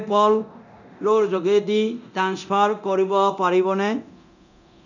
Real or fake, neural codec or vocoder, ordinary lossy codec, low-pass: fake; codec, 24 kHz, 0.9 kbps, DualCodec; none; 7.2 kHz